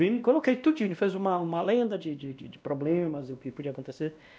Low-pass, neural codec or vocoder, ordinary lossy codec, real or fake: none; codec, 16 kHz, 1 kbps, X-Codec, WavLM features, trained on Multilingual LibriSpeech; none; fake